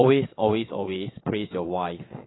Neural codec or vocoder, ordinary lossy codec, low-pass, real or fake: vocoder, 22.05 kHz, 80 mel bands, Vocos; AAC, 16 kbps; 7.2 kHz; fake